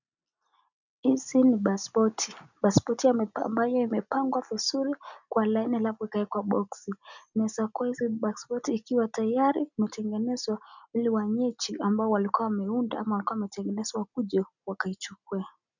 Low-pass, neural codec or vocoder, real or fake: 7.2 kHz; none; real